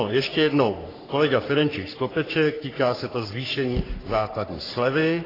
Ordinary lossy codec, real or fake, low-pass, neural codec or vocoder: AAC, 24 kbps; fake; 5.4 kHz; codec, 44.1 kHz, 3.4 kbps, Pupu-Codec